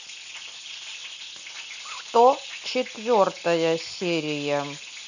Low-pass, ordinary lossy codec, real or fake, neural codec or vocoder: 7.2 kHz; none; real; none